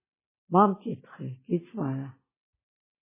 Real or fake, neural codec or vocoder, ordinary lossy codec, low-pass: real; none; MP3, 16 kbps; 3.6 kHz